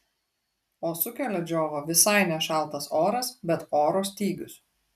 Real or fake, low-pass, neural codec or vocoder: real; 14.4 kHz; none